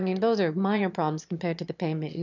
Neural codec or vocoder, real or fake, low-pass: autoencoder, 22.05 kHz, a latent of 192 numbers a frame, VITS, trained on one speaker; fake; 7.2 kHz